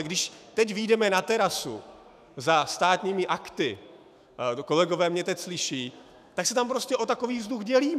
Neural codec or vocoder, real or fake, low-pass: autoencoder, 48 kHz, 128 numbers a frame, DAC-VAE, trained on Japanese speech; fake; 14.4 kHz